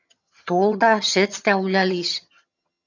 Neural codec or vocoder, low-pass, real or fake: vocoder, 22.05 kHz, 80 mel bands, HiFi-GAN; 7.2 kHz; fake